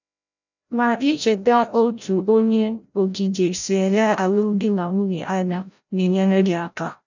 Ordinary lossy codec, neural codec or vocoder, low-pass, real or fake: none; codec, 16 kHz, 0.5 kbps, FreqCodec, larger model; 7.2 kHz; fake